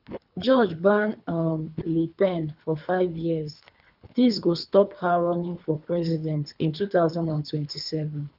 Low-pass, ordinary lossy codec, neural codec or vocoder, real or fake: 5.4 kHz; none; codec, 24 kHz, 3 kbps, HILCodec; fake